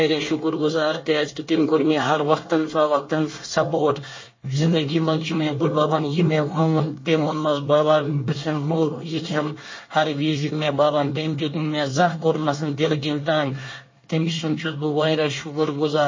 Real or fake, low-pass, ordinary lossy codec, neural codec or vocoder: fake; 7.2 kHz; MP3, 32 kbps; codec, 24 kHz, 1 kbps, SNAC